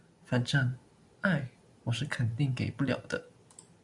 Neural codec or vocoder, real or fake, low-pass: none; real; 10.8 kHz